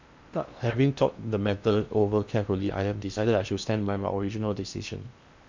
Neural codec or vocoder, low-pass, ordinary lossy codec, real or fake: codec, 16 kHz in and 24 kHz out, 0.8 kbps, FocalCodec, streaming, 65536 codes; 7.2 kHz; MP3, 64 kbps; fake